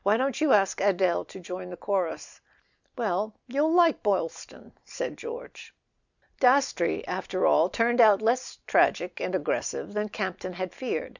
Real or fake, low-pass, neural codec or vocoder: real; 7.2 kHz; none